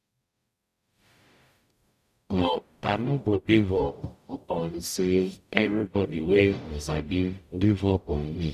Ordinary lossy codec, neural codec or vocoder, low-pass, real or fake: none; codec, 44.1 kHz, 0.9 kbps, DAC; 14.4 kHz; fake